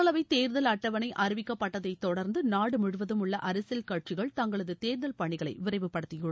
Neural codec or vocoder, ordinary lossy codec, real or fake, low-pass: none; none; real; none